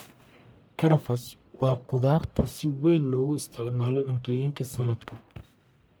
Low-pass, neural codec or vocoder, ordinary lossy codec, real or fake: none; codec, 44.1 kHz, 1.7 kbps, Pupu-Codec; none; fake